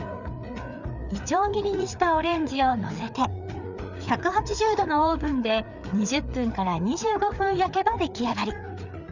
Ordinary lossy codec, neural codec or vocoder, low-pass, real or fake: none; codec, 16 kHz, 4 kbps, FreqCodec, larger model; 7.2 kHz; fake